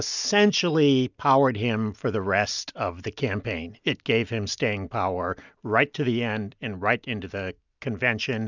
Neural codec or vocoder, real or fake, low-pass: none; real; 7.2 kHz